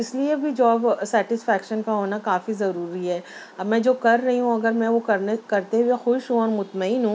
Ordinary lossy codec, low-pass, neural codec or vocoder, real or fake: none; none; none; real